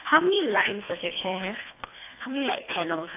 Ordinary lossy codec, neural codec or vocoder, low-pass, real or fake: AAC, 24 kbps; codec, 24 kHz, 1.5 kbps, HILCodec; 3.6 kHz; fake